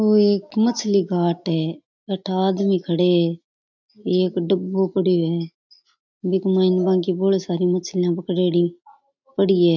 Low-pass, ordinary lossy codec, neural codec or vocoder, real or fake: 7.2 kHz; MP3, 64 kbps; none; real